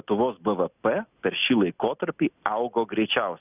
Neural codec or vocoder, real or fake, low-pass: none; real; 3.6 kHz